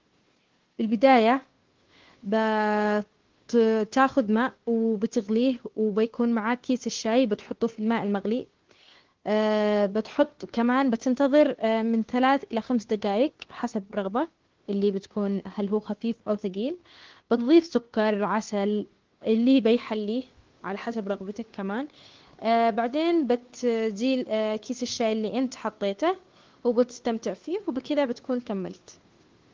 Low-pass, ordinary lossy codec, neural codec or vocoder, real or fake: 7.2 kHz; Opus, 16 kbps; codec, 16 kHz, 2 kbps, FunCodec, trained on Chinese and English, 25 frames a second; fake